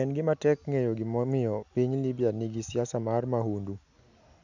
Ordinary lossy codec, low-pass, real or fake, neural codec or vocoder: none; 7.2 kHz; real; none